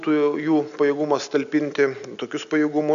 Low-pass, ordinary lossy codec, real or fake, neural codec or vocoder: 7.2 kHz; AAC, 96 kbps; real; none